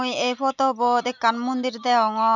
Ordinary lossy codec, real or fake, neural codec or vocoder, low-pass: none; real; none; 7.2 kHz